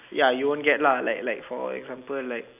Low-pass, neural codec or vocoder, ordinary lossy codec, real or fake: 3.6 kHz; none; none; real